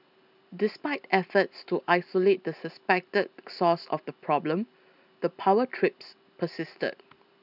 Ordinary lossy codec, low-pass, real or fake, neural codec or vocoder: none; 5.4 kHz; real; none